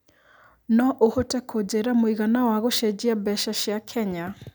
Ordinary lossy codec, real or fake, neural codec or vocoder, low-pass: none; real; none; none